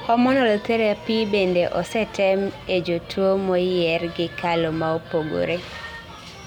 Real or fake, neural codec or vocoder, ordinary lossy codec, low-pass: real; none; none; 19.8 kHz